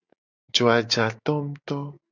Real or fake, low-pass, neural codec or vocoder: real; 7.2 kHz; none